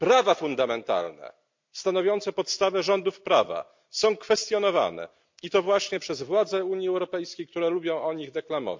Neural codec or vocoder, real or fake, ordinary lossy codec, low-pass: none; real; MP3, 64 kbps; 7.2 kHz